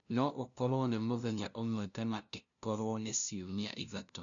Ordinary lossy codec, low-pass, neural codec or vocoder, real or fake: none; 7.2 kHz; codec, 16 kHz, 0.5 kbps, FunCodec, trained on LibriTTS, 25 frames a second; fake